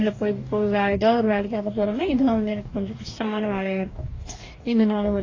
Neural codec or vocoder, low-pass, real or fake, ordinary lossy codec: codec, 44.1 kHz, 2.6 kbps, DAC; 7.2 kHz; fake; AAC, 32 kbps